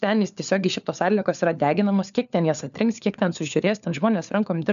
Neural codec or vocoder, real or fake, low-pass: codec, 16 kHz, 4 kbps, FunCodec, trained on LibriTTS, 50 frames a second; fake; 7.2 kHz